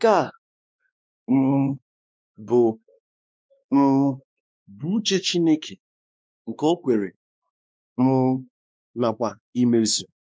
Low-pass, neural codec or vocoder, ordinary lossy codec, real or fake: none; codec, 16 kHz, 2 kbps, X-Codec, WavLM features, trained on Multilingual LibriSpeech; none; fake